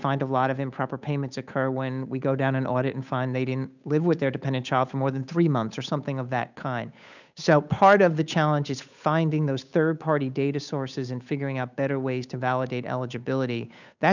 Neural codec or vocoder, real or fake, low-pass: codec, 16 kHz, 8 kbps, FunCodec, trained on Chinese and English, 25 frames a second; fake; 7.2 kHz